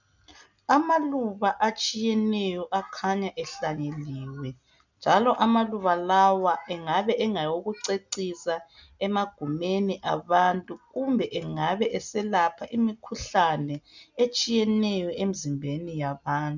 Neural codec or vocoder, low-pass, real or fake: none; 7.2 kHz; real